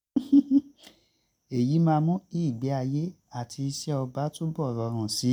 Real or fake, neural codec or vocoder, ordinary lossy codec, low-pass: real; none; none; 19.8 kHz